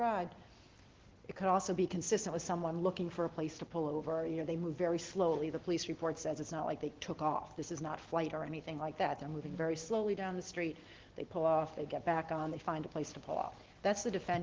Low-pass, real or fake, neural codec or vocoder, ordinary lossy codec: 7.2 kHz; real; none; Opus, 16 kbps